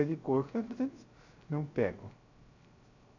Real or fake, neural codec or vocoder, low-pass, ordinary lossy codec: fake; codec, 16 kHz, 0.3 kbps, FocalCodec; 7.2 kHz; none